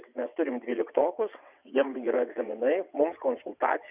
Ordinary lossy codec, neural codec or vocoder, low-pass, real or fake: Opus, 64 kbps; vocoder, 22.05 kHz, 80 mel bands, WaveNeXt; 3.6 kHz; fake